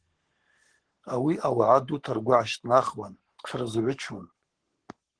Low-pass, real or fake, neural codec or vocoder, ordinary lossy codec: 9.9 kHz; fake; codec, 44.1 kHz, 7.8 kbps, Pupu-Codec; Opus, 16 kbps